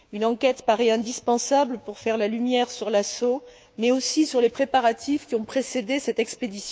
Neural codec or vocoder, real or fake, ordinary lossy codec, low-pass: codec, 16 kHz, 6 kbps, DAC; fake; none; none